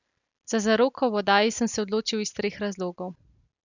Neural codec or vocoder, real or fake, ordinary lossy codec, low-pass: none; real; none; 7.2 kHz